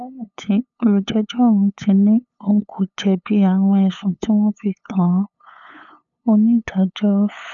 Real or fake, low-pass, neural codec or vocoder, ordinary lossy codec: fake; 7.2 kHz; codec, 16 kHz, 8 kbps, FunCodec, trained on LibriTTS, 25 frames a second; none